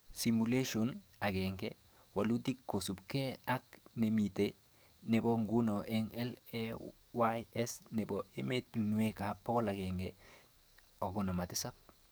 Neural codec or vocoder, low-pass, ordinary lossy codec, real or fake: codec, 44.1 kHz, 7.8 kbps, DAC; none; none; fake